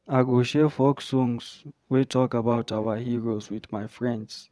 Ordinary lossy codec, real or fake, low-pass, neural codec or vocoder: none; fake; none; vocoder, 22.05 kHz, 80 mel bands, WaveNeXt